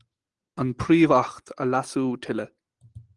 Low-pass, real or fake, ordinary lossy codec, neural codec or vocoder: 10.8 kHz; real; Opus, 24 kbps; none